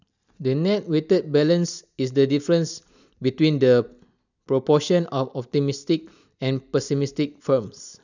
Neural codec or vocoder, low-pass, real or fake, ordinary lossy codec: none; 7.2 kHz; real; none